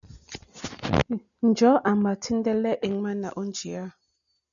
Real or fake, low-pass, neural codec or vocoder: real; 7.2 kHz; none